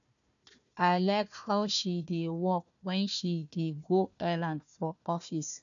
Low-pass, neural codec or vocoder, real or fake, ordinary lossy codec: 7.2 kHz; codec, 16 kHz, 1 kbps, FunCodec, trained on Chinese and English, 50 frames a second; fake; none